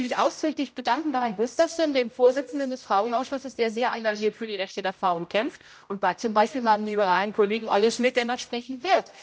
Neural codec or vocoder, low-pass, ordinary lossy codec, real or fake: codec, 16 kHz, 0.5 kbps, X-Codec, HuBERT features, trained on general audio; none; none; fake